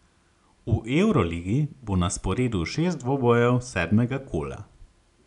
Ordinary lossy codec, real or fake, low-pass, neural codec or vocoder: none; real; 10.8 kHz; none